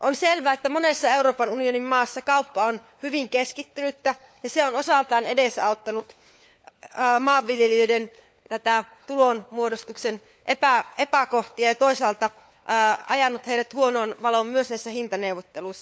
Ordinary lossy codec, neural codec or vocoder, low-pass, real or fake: none; codec, 16 kHz, 4 kbps, FunCodec, trained on LibriTTS, 50 frames a second; none; fake